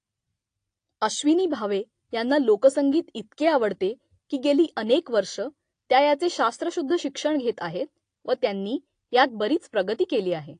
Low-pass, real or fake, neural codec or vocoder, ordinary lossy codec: 9.9 kHz; real; none; AAC, 48 kbps